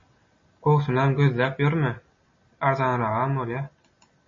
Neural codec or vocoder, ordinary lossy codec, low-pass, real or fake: none; MP3, 32 kbps; 7.2 kHz; real